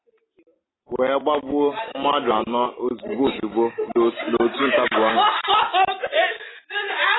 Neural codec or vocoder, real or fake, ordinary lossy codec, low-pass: none; real; AAC, 16 kbps; 7.2 kHz